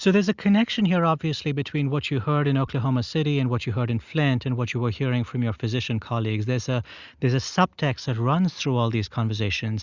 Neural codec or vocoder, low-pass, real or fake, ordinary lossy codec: none; 7.2 kHz; real; Opus, 64 kbps